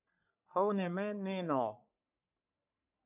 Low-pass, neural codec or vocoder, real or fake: 3.6 kHz; codec, 44.1 kHz, 3.4 kbps, Pupu-Codec; fake